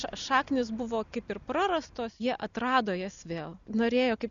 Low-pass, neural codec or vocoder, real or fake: 7.2 kHz; none; real